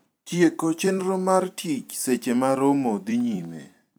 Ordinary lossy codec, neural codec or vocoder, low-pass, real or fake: none; vocoder, 44.1 kHz, 128 mel bands every 256 samples, BigVGAN v2; none; fake